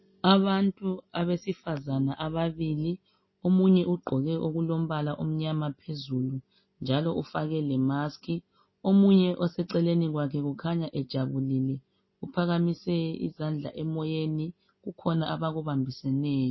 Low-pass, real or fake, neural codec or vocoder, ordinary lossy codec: 7.2 kHz; real; none; MP3, 24 kbps